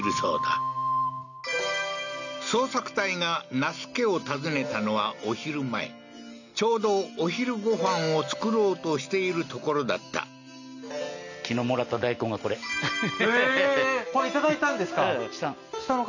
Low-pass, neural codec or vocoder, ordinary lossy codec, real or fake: 7.2 kHz; none; none; real